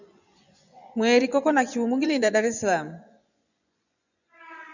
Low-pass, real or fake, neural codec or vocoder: 7.2 kHz; fake; vocoder, 24 kHz, 100 mel bands, Vocos